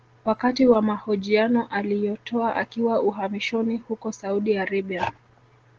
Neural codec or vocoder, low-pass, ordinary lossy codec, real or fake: none; 7.2 kHz; Opus, 16 kbps; real